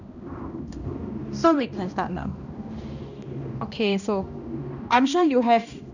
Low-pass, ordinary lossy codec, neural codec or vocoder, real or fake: 7.2 kHz; none; codec, 16 kHz, 1 kbps, X-Codec, HuBERT features, trained on balanced general audio; fake